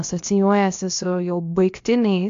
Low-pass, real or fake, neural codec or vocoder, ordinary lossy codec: 7.2 kHz; fake; codec, 16 kHz, about 1 kbps, DyCAST, with the encoder's durations; AAC, 64 kbps